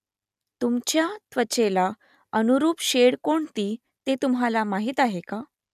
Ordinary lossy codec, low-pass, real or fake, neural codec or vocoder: none; 14.4 kHz; real; none